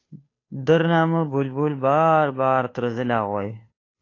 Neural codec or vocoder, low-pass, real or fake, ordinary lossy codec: codec, 16 kHz, 2 kbps, FunCodec, trained on Chinese and English, 25 frames a second; 7.2 kHz; fake; AAC, 32 kbps